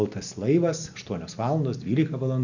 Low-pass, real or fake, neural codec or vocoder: 7.2 kHz; real; none